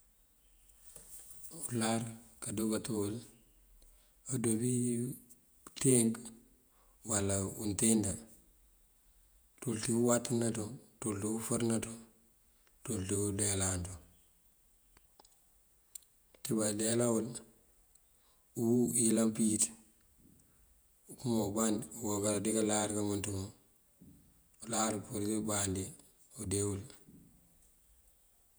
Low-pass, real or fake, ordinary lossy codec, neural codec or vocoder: none; fake; none; vocoder, 48 kHz, 128 mel bands, Vocos